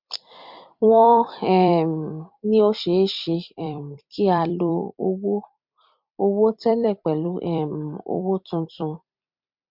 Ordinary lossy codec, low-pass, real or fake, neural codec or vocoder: MP3, 48 kbps; 5.4 kHz; fake; vocoder, 44.1 kHz, 128 mel bands every 256 samples, BigVGAN v2